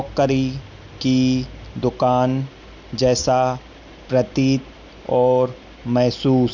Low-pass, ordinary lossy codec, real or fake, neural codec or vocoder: 7.2 kHz; none; real; none